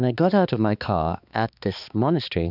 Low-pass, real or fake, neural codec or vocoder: 5.4 kHz; fake; codec, 16 kHz, 2 kbps, X-Codec, HuBERT features, trained on balanced general audio